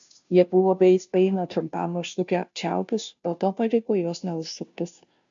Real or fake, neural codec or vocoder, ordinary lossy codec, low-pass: fake; codec, 16 kHz, 0.5 kbps, FunCodec, trained on Chinese and English, 25 frames a second; MP3, 64 kbps; 7.2 kHz